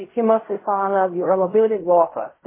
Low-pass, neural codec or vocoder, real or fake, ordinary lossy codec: 3.6 kHz; codec, 16 kHz in and 24 kHz out, 0.4 kbps, LongCat-Audio-Codec, fine tuned four codebook decoder; fake; MP3, 16 kbps